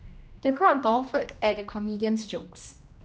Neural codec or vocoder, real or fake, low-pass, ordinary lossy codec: codec, 16 kHz, 1 kbps, X-Codec, HuBERT features, trained on general audio; fake; none; none